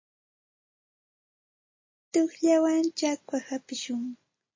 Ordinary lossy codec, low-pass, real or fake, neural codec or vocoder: MP3, 32 kbps; 7.2 kHz; real; none